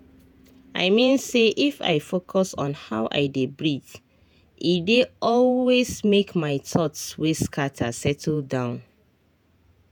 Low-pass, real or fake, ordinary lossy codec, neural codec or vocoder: none; fake; none; vocoder, 48 kHz, 128 mel bands, Vocos